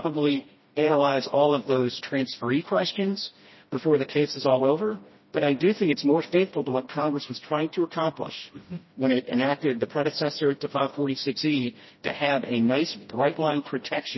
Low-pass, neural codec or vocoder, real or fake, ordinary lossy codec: 7.2 kHz; codec, 16 kHz, 1 kbps, FreqCodec, smaller model; fake; MP3, 24 kbps